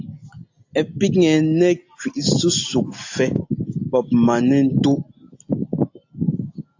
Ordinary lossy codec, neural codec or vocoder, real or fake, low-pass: AAC, 48 kbps; none; real; 7.2 kHz